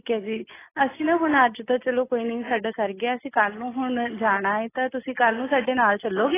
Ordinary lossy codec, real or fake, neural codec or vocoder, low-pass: AAC, 16 kbps; real; none; 3.6 kHz